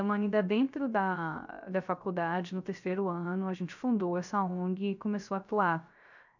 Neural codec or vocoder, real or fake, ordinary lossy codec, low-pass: codec, 16 kHz, 0.3 kbps, FocalCodec; fake; none; 7.2 kHz